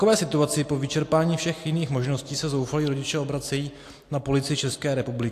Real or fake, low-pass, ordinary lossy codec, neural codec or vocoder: real; 14.4 kHz; AAC, 64 kbps; none